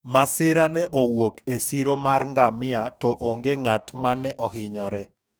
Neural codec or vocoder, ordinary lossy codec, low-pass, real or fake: codec, 44.1 kHz, 2.6 kbps, DAC; none; none; fake